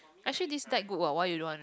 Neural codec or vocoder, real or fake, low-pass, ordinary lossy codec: none; real; none; none